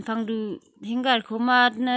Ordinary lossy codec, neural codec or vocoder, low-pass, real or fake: none; none; none; real